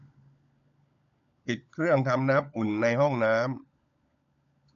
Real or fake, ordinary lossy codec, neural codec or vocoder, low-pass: fake; none; codec, 16 kHz, 16 kbps, FunCodec, trained on LibriTTS, 50 frames a second; 7.2 kHz